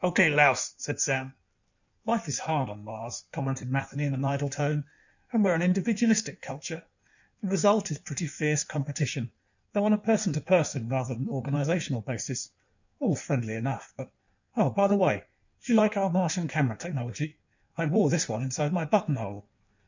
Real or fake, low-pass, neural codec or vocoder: fake; 7.2 kHz; codec, 16 kHz in and 24 kHz out, 1.1 kbps, FireRedTTS-2 codec